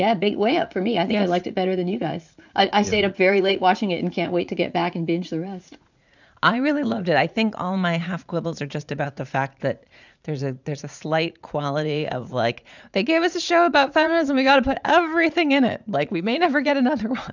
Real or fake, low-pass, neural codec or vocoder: fake; 7.2 kHz; vocoder, 44.1 kHz, 80 mel bands, Vocos